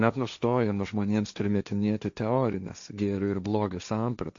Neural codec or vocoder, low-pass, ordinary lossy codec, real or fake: codec, 16 kHz, 1.1 kbps, Voila-Tokenizer; 7.2 kHz; AAC, 64 kbps; fake